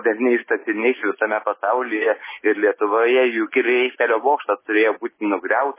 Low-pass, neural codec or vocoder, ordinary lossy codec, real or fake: 3.6 kHz; none; MP3, 16 kbps; real